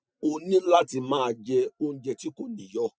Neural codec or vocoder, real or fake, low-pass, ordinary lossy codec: none; real; none; none